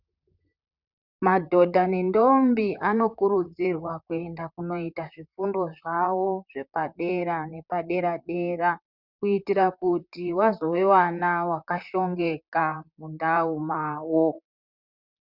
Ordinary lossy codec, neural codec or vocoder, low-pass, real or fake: Opus, 64 kbps; vocoder, 44.1 kHz, 128 mel bands, Pupu-Vocoder; 5.4 kHz; fake